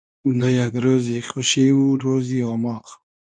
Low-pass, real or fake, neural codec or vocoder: 9.9 kHz; fake; codec, 24 kHz, 0.9 kbps, WavTokenizer, medium speech release version 2